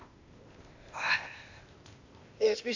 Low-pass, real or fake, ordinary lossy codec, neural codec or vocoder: 7.2 kHz; fake; AAC, 48 kbps; codec, 16 kHz, 0.8 kbps, ZipCodec